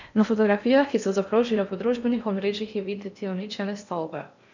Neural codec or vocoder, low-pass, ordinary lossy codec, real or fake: codec, 16 kHz in and 24 kHz out, 0.8 kbps, FocalCodec, streaming, 65536 codes; 7.2 kHz; none; fake